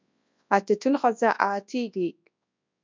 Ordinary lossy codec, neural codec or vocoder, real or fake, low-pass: MP3, 64 kbps; codec, 24 kHz, 0.9 kbps, WavTokenizer, large speech release; fake; 7.2 kHz